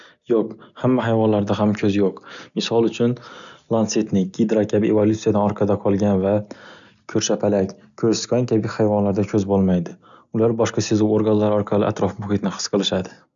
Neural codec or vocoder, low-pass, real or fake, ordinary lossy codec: none; 7.2 kHz; real; none